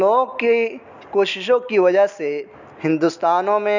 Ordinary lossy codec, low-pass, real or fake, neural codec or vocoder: none; 7.2 kHz; real; none